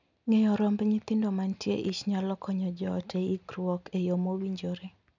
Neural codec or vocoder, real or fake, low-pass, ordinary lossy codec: none; real; 7.2 kHz; none